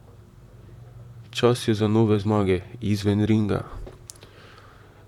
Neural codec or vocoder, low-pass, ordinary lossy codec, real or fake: vocoder, 44.1 kHz, 128 mel bands, Pupu-Vocoder; 19.8 kHz; none; fake